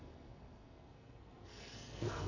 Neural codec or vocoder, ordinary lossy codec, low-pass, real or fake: codec, 44.1 kHz, 2.6 kbps, SNAC; none; 7.2 kHz; fake